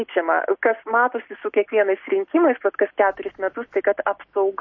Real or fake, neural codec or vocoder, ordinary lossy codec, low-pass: real; none; MP3, 32 kbps; 7.2 kHz